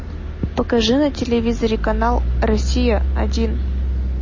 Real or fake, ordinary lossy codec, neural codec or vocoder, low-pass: real; MP3, 32 kbps; none; 7.2 kHz